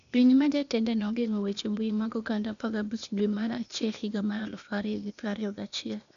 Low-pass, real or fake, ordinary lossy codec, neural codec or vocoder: 7.2 kHz; fake; none; codec, 16 kHz, 0.8 kbps, ZipCodec